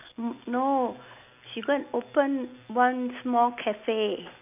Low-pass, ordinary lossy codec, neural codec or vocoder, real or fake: 3.6 kHz; none; none; real